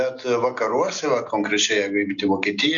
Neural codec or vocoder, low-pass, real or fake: none; 7.2 kHz; real